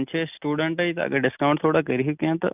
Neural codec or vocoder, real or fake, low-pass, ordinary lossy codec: none; real; 3.6 kHz; none